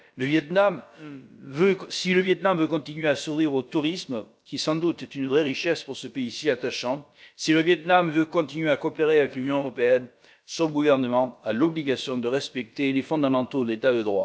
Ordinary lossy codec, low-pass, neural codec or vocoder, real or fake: none; none; codec, 16 kHz, about 1 kbps, DyCAST, with the encoder's durations; fake